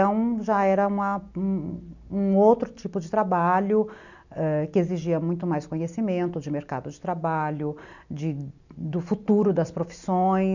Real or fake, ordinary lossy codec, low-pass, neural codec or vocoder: real; none; 7.2 kHz; none